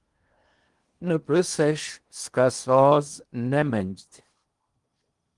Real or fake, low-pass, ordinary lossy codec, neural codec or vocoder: fake; 10.8 kHz; Opus, 24 kbps; codec, 16 kHz in and 24 kHz out, 0.8 kbps, FocalCodec, streaming, 65536 codes